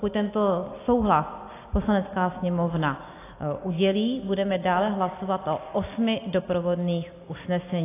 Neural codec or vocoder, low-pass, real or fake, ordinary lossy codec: none; 3.6 kHz; real; AAC, 24 kbps